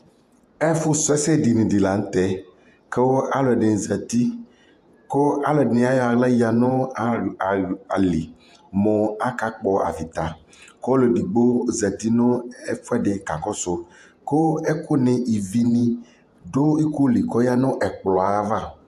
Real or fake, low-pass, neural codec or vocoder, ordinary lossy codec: fake; 14.4 kHz; vocoder, 48 kHz, 128 mel bands, Vocos; AAC, 96 kbps